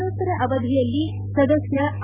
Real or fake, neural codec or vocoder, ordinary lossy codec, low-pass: real; none; Opus, 64 kbps; 3.6 kHz